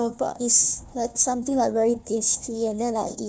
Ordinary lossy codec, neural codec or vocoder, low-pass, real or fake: none; codec, 16 kHz, 1 kbps, FunCodec, trained on Chinese and English, 50 frames a second; none; fake